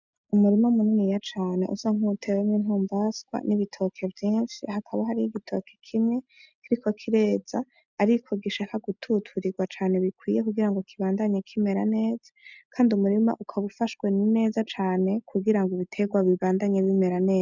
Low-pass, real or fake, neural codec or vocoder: 7.2 kHz; real; none